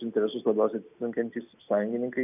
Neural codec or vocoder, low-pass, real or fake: none; 3.6 kHz; real